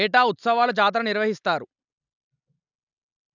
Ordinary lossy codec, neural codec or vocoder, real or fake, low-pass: none; none; real; 7.2 kHz